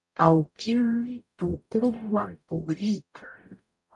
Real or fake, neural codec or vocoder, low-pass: fake; codec, 44.1 kHz, 0.9 kbps, DAC; 10.8 kHz